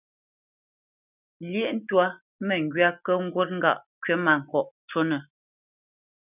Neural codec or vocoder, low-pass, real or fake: none; 3.6 kHz; real